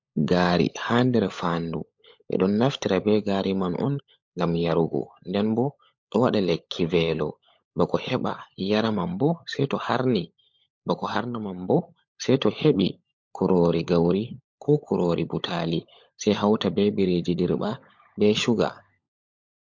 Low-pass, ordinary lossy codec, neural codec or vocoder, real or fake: 7.2 kHz; MP3, 48 kbps; codec, 16 kHz, 16 kbps, FunCodec, trained on LibriTTS, 50 frames a second; fake